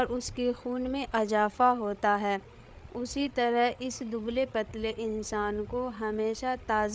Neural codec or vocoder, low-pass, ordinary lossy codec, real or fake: codec, 16 kHz, 8 kbps, FreqCodec, larger model; none; none; fake